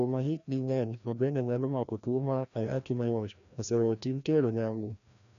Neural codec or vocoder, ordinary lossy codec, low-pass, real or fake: codec, 16 kHz, 1 kbps, FreqCodec, larger model; none; 7.2 kHz; fake